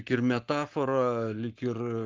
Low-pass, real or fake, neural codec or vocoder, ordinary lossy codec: 7.2 kHz; fake; codec, 44.1 kHz, 7.8 kbps, Pupu-Codec; Opus, 24 kbps